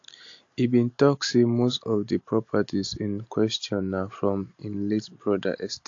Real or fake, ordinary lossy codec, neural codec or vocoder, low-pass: real; AAC, 48 kbps; none; 7.2 kHz